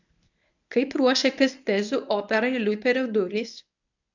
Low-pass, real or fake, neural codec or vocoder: 7.2 kHz; fake; codec, 24 kHz, 0.9 kbps, WavTokenizer, medium speech release version 1